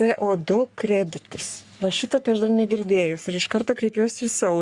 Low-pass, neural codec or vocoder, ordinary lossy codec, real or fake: 10.8 kHz; codec, 44.1 kHz, 3.4 kbps, Pupu-Codec; Opus, 64 kbps; fake